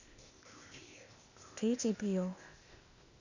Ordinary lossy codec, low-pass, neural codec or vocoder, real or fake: none; 7.2 kHz; codec, 16 kHz, 0.8 kbps, ZipCodec; fake